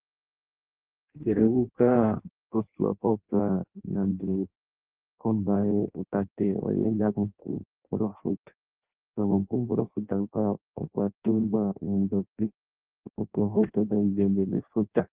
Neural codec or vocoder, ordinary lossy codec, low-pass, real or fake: codec, 16 kHz in and 24 kHz out, 0.6 kbps, FireRedTTS-2 codec; Opus, 16 kbps; 3.6 kHz; fake